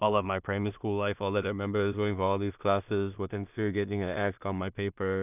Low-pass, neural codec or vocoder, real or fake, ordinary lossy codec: 3.6 kHz; codec, 16 kHz in and 24 kHz out, 0.4 kbps, LongCat-Audio-Codec, two codebook decoder; fake; none